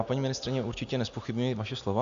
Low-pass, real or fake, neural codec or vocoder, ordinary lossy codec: 7.2 kHz; real; none; AAC, 64 kbps